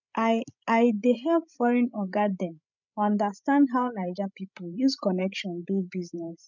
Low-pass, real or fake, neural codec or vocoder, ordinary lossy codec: 7.2 kHz; fake; codec, 16 kHz, 16 kbps, FreqCodec, larger model; none